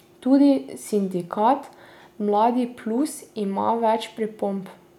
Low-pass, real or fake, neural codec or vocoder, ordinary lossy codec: 19.8 kHz; real; none; none